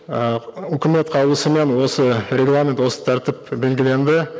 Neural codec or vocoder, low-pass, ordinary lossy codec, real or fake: none; none; none; real